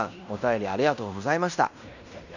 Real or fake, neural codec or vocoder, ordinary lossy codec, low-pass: fake; codec, 16 kHz in and 24 kHz out, 0.9 kbps, LongCat-Audio-Codec, fine tuned four codebook decoder; none; 7.2 kHz